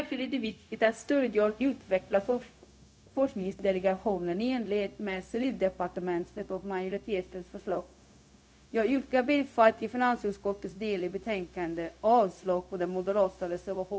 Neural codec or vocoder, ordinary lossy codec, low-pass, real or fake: codec, 16 kHz, 0.4 kbps, LongCat-Audio-Codec; none; none; fake